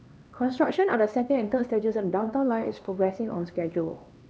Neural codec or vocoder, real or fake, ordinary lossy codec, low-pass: codec, 16 kHz, 2 kbps, X-Codec, HuBERT features, trained on LibriSpeech; fake; none; none